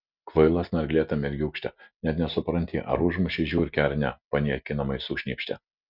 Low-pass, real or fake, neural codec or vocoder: 5.4 kHz; real; none